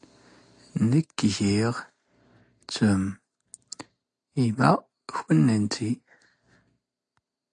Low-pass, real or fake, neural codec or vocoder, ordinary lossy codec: 9.9 kHz; real; none; AAC, 48 kbps